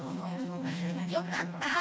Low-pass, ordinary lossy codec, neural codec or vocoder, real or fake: none; none; codec, 16 kHz, 1 kbps, FreqCodec, smaller model; fake